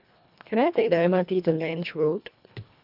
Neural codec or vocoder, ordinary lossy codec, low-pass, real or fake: codec, 24 kHz, 1.5 kbps, HILCodec; none; 5.4 kHz; fake